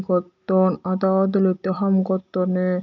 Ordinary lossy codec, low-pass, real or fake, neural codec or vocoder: none; 7.2 kHz; real; none